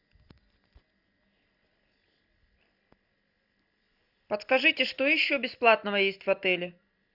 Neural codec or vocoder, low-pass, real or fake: none; 5.4 kHz; real